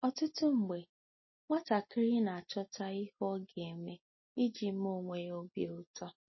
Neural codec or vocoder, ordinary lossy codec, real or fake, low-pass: none; MP3, 24 kbps; real; 7.2 kHz